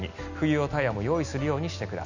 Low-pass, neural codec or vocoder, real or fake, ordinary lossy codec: 7.2 kHz; none; real; none